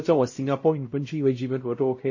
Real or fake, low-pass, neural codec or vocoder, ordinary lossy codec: fake; 7.2 kHz; codec, 16 kHz, 0.5 kbps, X-Codec, WavLM features, trained on Multilingual LibriSpeech; MP3, 32 kbps